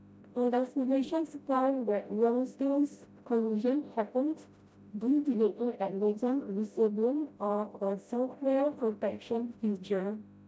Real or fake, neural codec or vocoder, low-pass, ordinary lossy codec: fake; codec, 16 kHz, 0.5 kbps, FreqCodec, smaller model; none; none